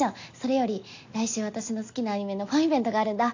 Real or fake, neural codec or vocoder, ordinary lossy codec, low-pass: real; none; AAC, 48 kbps; 7.2 kHz